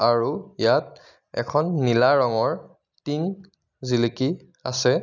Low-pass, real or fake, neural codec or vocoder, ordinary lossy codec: 7.2 kHz; real; none; none